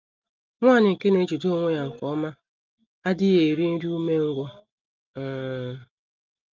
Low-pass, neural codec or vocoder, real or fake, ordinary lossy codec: 7.2 kHz; none; real; Opus, 32 kbps